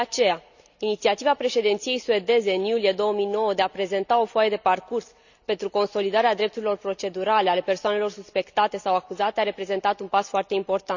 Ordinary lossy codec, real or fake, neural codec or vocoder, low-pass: none; real; none; 7.2 kHz